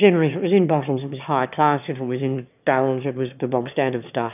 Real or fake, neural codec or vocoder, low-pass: fake; autoencoder, 22.05 kHz, a latent of 192 numbers a frame, VITS, trained on one speaker; 3.6 kHz